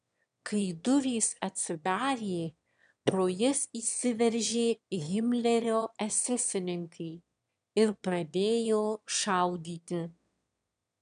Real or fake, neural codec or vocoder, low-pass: fake; autoencoder, 22.05 kHz, a latent of 192 numbers a frame, VITS, trained on one speaker; 9.9 kHz